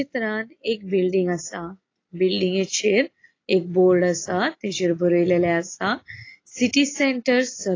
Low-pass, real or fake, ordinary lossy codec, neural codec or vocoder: 7.2 kHz; real; AAC, 32 kbps; none